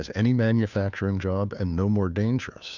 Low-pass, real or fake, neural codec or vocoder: 7.2 kHz; fake; codec, 16 kHz, 2 kbps, FunCodec, trained on Chinese and English, 25 frames a second